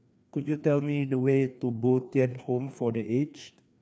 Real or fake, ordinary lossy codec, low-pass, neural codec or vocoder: fake; none; none; codec, 16 kHz, 2 kbps, FreqCodec, larger model